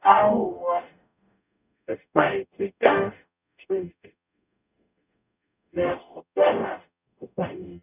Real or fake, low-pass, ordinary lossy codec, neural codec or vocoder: fake; 3.6 kHz; none; codec, 44.1 kHz, 0.9 kbps, DAC